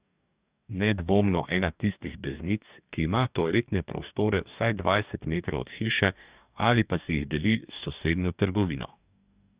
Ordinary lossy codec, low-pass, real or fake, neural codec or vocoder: Opus, 64 kbps; 3.6 kHz; fake; codec, 44.1 kHz, 2.6 kbps, DAC